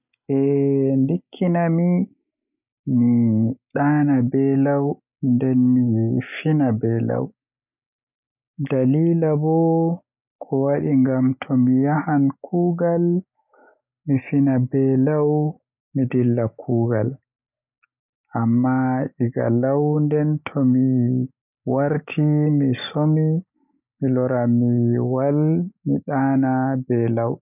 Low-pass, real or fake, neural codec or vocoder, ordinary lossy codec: 3.6 kHz; real; none; none